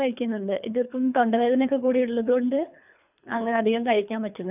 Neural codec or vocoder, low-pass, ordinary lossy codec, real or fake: codec, 24 kHz, 3 kbps, HILCodec; 3.6 kHz; none; fake